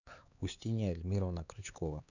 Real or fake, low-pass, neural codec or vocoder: fake; 7.2 kHz; codec, 16 kHz, 4 kbps, X-Codec, HuBERT features, trained on LibriSpeech